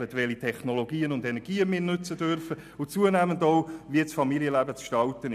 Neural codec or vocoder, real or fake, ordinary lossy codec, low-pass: none; real; none; 14.4 kHz